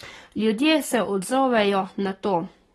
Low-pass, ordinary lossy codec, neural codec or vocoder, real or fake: 19.8 kHz; AAC, 32 kbps; codec, 44.1 kHz, 7.8 kbps, Pupu-Codec; fake